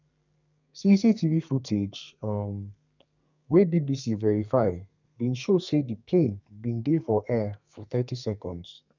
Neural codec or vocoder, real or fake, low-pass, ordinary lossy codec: codec, 32 kHz, 1.9 kbps, SNAC; fake; 7.2 kHz; none